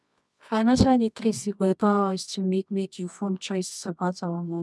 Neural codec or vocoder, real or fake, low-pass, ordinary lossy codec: codec, 24 kHz, 0.9 kbps, WavTokenizer, medium music audio release; fake; none; none